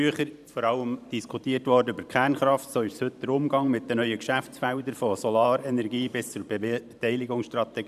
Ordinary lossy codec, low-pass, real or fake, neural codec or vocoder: none; 14.4 kHz; fake; vocoder, 44.1 kHz, 128 mel bands every 512 samples, BigVGAN v2